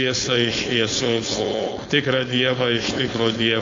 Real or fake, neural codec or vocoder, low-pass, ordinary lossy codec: fake; codec, 16 kHz, 4.8 kbps, FACodec; 7.2 kHz; AAC, 48 kbps